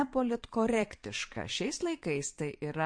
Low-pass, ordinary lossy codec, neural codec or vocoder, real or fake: 9.9 kHz; MP3, 48 kbps; vocoder, 22.05 kHz, 80 mel bands, Vocos; fake